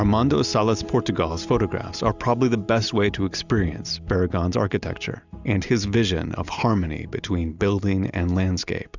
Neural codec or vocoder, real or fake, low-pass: none; real; 7.2 kHz